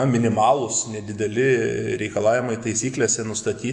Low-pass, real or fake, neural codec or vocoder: 10.8 kHz; fake; autoencoder, 48 kHz, 128 numbers a frame, DAC-VAE, trained on Japanese speech